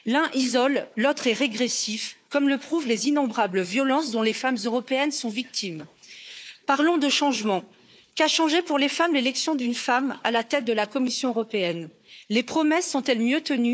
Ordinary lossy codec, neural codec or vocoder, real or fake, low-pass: none; codec, 16 kHz, 4 kbps, FunCodec, trained on Chinese and English, 50 frames a second; fake; none